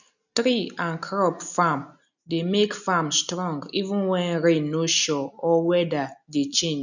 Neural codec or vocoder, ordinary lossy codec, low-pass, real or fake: none; none; 7.2 kHz; real